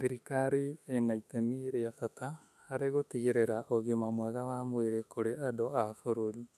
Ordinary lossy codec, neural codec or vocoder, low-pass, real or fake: none; autoencoder, 48 kHz, 32 numbers a frame, DAC-VAE, trained on Japanese speech; 14.4 kHz; fake